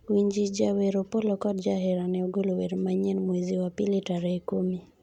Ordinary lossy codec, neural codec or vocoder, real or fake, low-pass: none; none; real; 19.8 kHz